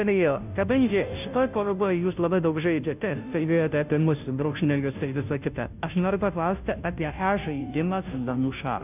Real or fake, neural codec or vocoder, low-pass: fake; codec, 16 kHz, 0.5 kbps, FunCodec, trained on Chinese and English, 25 frames a second; 3.6 kHz